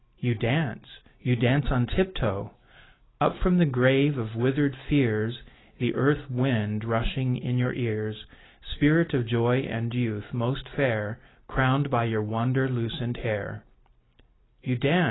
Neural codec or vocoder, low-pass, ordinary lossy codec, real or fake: none; 7.2 kHz; AAC, 16 kbps; real